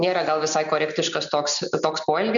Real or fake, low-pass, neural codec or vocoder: real; 7.2 kHz; none